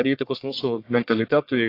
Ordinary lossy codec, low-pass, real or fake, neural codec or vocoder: AAC, 32 kbps; 5.4 kHz; fake; codec, 44.1 kHz, 1.7 kbps, Pupu-Codec